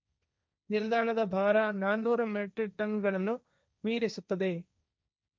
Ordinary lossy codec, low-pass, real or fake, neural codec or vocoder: none; none; fake; codec, 16 kHz, 1.1 kbps, Voila-Tokenizer